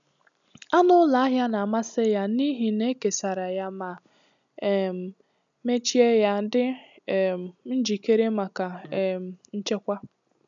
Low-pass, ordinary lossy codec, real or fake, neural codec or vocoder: 7.2 kHz; none; real; none